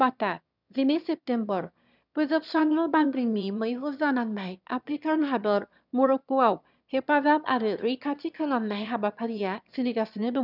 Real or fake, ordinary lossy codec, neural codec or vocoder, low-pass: fake; none; autoencoder, 22.05 kHz, a latent of 192 numbers a frame, VITS, trained on one speaker; 5.4 kHz